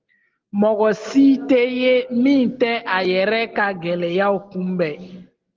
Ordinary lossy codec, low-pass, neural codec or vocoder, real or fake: Opus, 16 kbps; 7.2 kHz; none; real